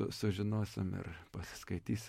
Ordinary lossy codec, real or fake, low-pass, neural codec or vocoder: MP3, 64 kbps; real; 19.8 kHz; none